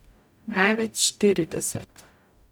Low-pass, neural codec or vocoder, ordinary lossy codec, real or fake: none; codec, 44.1 kHz, 0.9 kbps, DAC; none; fake